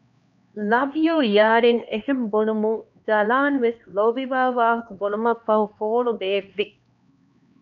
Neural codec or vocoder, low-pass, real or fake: codec, 16 kHz, 2 kbps, X-Codec, HuBERT features, trained on LibriSpeech; 7.2 kHz; fake